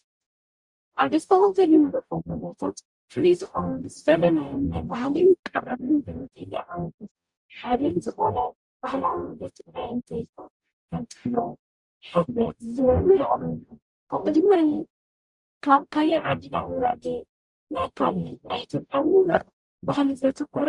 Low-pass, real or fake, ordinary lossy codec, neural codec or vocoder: 10.8 kHz; fake; Opus, 64 kbps; codec, 44.1 kHz, 0.9 kbps, DAC